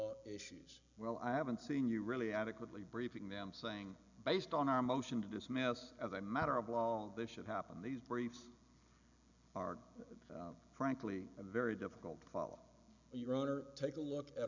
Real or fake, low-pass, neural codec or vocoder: real; 7.2 kHz; none